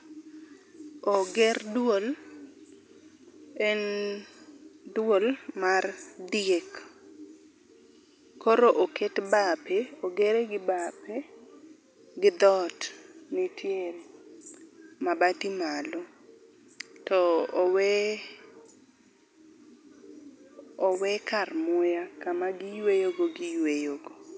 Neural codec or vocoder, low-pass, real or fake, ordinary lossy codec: none; none; real; none